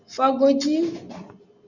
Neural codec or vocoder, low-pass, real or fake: none; 7.2 kHz; real